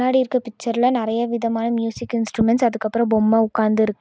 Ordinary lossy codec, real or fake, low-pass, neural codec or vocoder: none; real; none; none